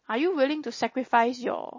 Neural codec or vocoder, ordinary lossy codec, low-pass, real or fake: none; MP3, 32 kbps; 7.2 kHz; real